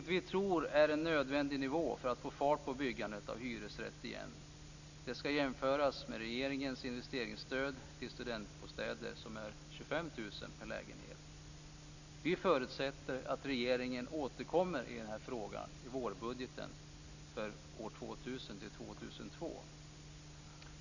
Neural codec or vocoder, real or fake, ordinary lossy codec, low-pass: none; real; none; 7.2 kHz